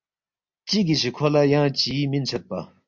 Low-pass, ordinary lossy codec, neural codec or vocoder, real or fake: 7.2 kHz; MP3, 48 kbps; none; real